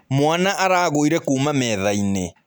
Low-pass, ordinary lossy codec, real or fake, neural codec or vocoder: none; none; real; none